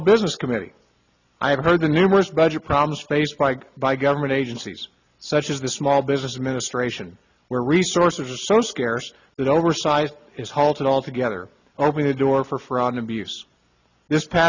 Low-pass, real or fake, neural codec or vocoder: 7.2 kHz; real; none